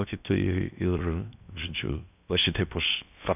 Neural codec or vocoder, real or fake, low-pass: codec, 16 kHz in and 24 kHz out, 0.6 kbps, FocalCodec, streaming, 4096 codes; fake; 3.6 kHz